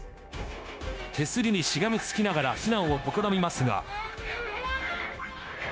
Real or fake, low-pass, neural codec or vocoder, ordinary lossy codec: fake; none; codec, 16 kHz, 0.9 kbps, LongCat-Audio-Codec; none